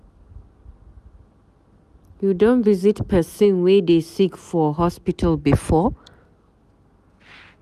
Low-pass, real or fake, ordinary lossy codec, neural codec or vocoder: 14.4 kHz; real; none; none